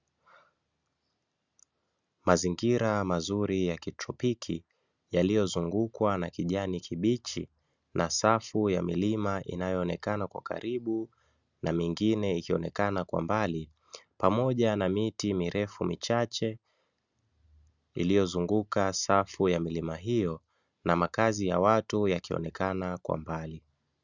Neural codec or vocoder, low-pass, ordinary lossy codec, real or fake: none; 7.2 kHz; Opus, 64 kbps; real